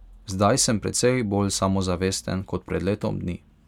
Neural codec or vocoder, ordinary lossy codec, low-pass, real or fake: none; none; 19.8 kHz; real